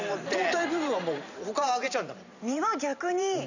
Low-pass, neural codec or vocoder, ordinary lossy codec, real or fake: 7.2 kHz; vocoder, 44.1 kHz, 128 mel bands every 512 samples, BigVGAN v2; none; fake